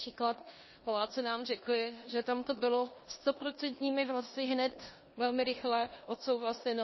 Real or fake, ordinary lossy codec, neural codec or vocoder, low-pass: fake; MP3, 24 kbps; codec, 16 kHz in and 24 kHz out, 0.9 kbps, LongCat-Audio-Codec, four codebook decoder; 7.2 kHz